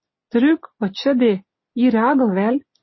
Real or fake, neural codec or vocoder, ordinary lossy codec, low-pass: real; none; MP3, 24 kbps; 7.2 kHz